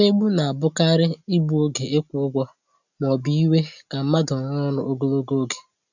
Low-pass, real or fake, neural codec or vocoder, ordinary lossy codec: 7.2 kHz; real; none; none